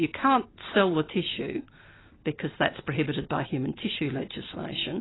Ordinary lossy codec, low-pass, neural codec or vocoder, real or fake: AAC, 16 kbps; 7.2 kHz; none; real